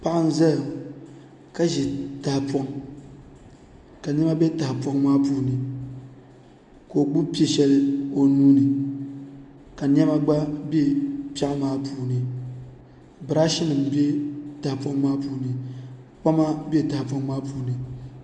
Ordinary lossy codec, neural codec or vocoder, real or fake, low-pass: MP3, 64 kbps; none; real; 9.9 kHz